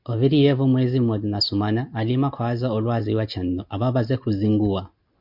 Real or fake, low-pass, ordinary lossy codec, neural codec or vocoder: real; 5.4 kHz; MP3, 48 kbps; none